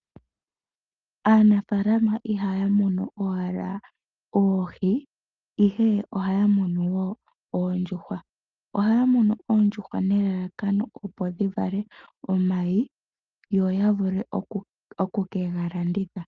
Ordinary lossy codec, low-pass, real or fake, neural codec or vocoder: Opus, 24 kbps; 7.2 kHz; real; none